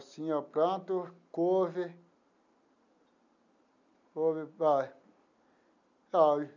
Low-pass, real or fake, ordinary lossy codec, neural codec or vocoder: 7.2 kHz; real; none; none